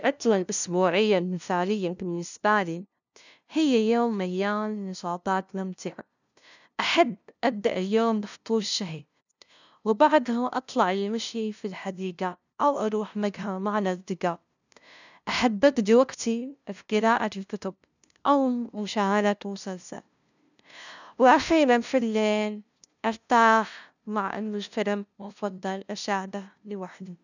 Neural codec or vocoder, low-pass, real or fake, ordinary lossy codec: codec, 16 kHz, 0.5 kbps, FunCodec, trained on LibriTTS, 25 frames a second; 7.2 kHz; fake; none